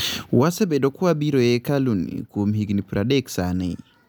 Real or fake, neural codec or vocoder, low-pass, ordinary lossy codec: real; none; none; none